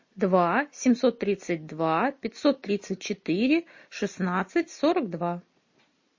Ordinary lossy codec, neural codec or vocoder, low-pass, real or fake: MP3, 32 kbps; none; 7.2 kHz; real